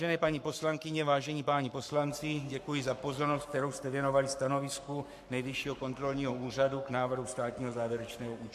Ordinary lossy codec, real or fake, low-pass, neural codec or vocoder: MP3, 96 kbps; fake; 14.4 kHz; codec, 44.1 kHz, 7.8 kbps, Pupu-Codec